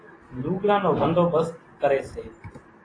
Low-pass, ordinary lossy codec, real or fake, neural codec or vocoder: 9.9 kHz; AAC, 32 kbps; real; none